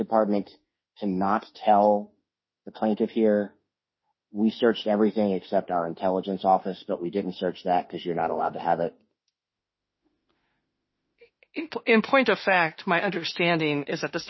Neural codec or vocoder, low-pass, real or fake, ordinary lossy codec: autoencoder, 48 kHz, 32 numbers a frame, DAC-VAE, trained on Japanese speech; 7.2 kHz; fake; MP3, 24 kbps